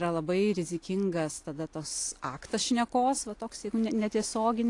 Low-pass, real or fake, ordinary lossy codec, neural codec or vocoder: 10.8 kHz; real; AAC, 48 kbps; none